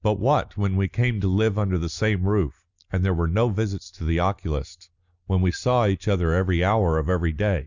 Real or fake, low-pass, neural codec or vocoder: real; 7.2 kHz; none